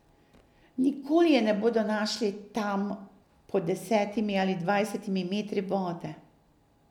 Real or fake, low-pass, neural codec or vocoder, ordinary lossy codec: real; 19.8 kHz; none; none